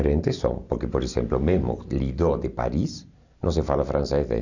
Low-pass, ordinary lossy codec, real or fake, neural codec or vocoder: 7.2 kHz; none; real; none